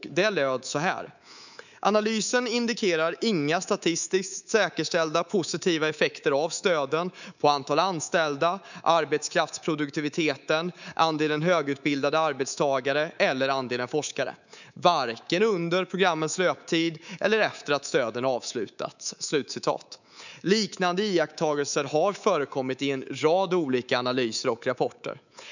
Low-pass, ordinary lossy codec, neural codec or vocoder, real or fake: 7.2 kHz; none; codec, 24 kHz, 3.1 kbps, DualCodec; fake